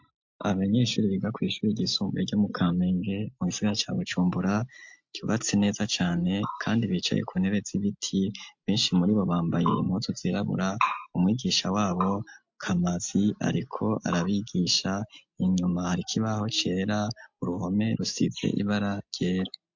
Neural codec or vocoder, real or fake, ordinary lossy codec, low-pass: none; real; MP3, 48 kbps; 7.2 kHz